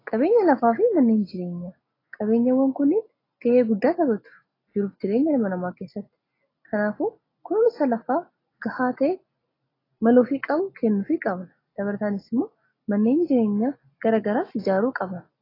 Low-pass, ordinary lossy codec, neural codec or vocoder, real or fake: 5.4 kHz; AAC, 24 kbps; none; real